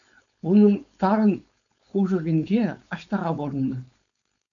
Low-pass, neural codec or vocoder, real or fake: 7.2 kHz; codec, 16 kHz, 4.8 kbps, FACodec; fake